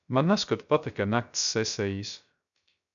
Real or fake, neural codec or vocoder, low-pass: fake; codec, 16 kHz, 0.3 kbps, FocalCodec; 7.2 kHz